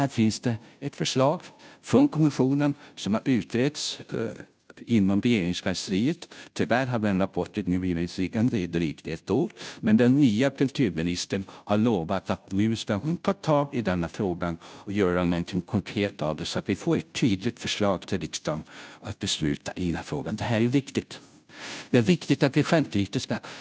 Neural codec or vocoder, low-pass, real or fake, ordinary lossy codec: codec, 16 kHz, 0.5 kbps, FunCodec, trained on Chinese and English, 25 frames a second; none; fake; none